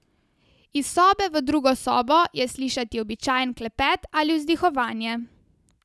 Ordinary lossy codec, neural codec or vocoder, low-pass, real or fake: none; none; none; real